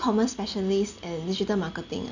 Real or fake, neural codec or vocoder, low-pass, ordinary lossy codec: real; none; 7.2 kHz; none